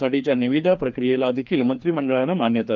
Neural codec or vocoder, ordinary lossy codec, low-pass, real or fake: codec, 16 kHz, 2 kbps, FreqCodec, larger model; Opus, 24 kbps; 7.2 kHz; fake